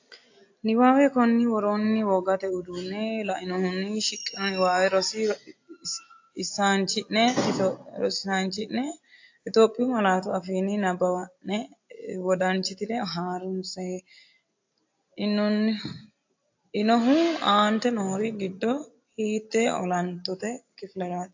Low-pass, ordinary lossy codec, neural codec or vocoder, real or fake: 7.2 kHz; AAC, 48 kbps; none; real